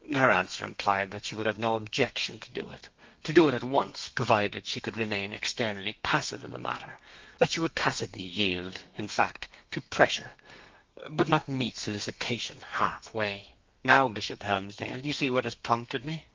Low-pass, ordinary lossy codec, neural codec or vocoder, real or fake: 7.2 kHz; Opus, 32 kbps; codec, 32 kHz, 1.9 kbps, SNAC; fake